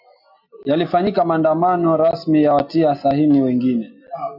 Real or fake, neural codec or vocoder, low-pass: real; none; 5.4 kHz